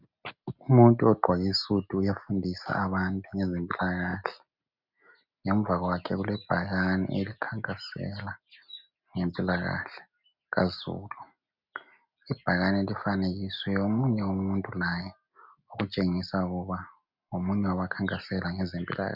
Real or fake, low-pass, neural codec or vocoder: real; 5.4 kHz; none